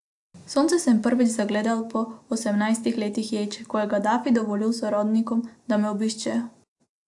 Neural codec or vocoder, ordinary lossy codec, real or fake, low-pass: none; AAC, 64 kbps; real; 10.8 kHz